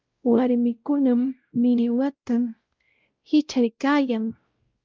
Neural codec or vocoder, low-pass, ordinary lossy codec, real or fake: codec, 16 kHz, 0.5 kbps, X-Codec, WavLM features, trained on Multilingual LibriSpeech; 7.2 kHz; Opus, 32 kbps; fake